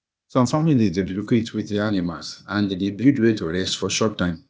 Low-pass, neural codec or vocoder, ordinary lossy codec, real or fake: none; codec, 16 kHz, 0.8 kbps, ZipCodec; none; fake